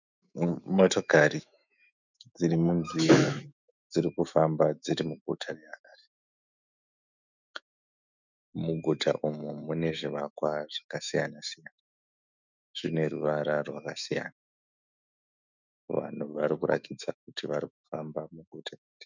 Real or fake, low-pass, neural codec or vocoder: fake; 7.2 kHz; autoencoder, 48 kHz, 128 numbers a frame, DAC-VAE, trained on Japanese speech